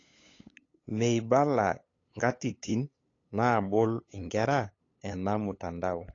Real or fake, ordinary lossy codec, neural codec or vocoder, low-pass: fake; AAC, 32 kbps; codec, 16 kHz, 8 kbps, FunCodec, trained on LibriTTS, 25 frames a second; 7.2 kHz